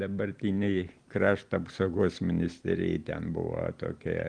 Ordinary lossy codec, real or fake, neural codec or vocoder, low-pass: Opus, 24 kbps; real; none; 9.9 kHz